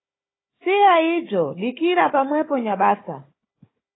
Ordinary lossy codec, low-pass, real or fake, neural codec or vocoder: AAC, 16 kbps; 7.2 kHz; fake; codec, 16 kHz, 4 kbps, FunCodec, trained on Chinese and English, 50 frames a second